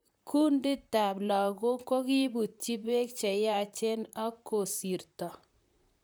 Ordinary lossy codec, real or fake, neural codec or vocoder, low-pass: none; fake; vocoder, 44.1 kHz, 128 mel bands, Pupu-Vocoder; none